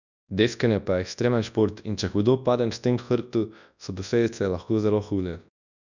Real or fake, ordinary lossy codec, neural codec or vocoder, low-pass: fake; none; codec, 24 kHz, 0.9 kbps, WavTokenizer, large speech release; 7.2 kHz